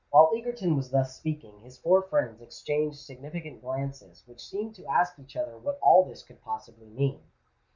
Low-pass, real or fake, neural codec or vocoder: 7.2 kHz; real; none